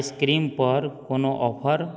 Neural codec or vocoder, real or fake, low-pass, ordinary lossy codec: none; real; none; none